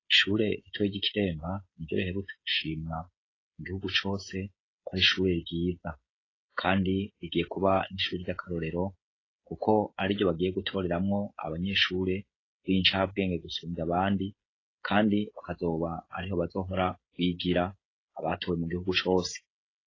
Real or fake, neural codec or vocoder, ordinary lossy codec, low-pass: fake; codec, 16 kHz, 16 kbps, FreqCodec, smaller model; AAC, 32 kbps; 7.2 kHz